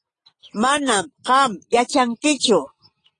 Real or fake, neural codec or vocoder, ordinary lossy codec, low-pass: real; none; AAC, 32 kbps; 9.9 kHz